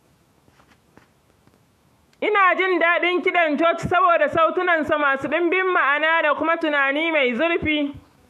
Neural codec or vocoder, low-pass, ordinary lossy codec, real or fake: autoencoder, 48 kHz, 128 numbers a frame, DAC-VAE, trained on Japanese speech; 14.4 kHz; MP3, 64 kbps; fake